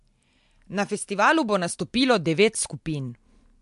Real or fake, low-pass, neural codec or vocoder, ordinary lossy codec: real; 10.8 kHz; none; MP3, 64 kbps